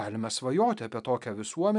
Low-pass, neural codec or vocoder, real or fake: 10.8 kHz; none; real